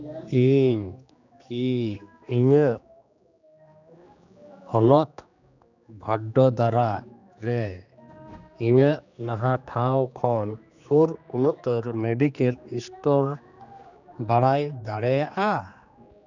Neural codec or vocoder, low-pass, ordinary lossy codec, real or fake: codec, 16 kHz, 2 kbps, X-Codec, HuBERT features, trained on general audio; 7.2 kHz; none; fake